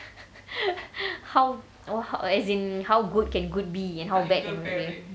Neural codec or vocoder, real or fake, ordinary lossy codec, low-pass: none; real; none; none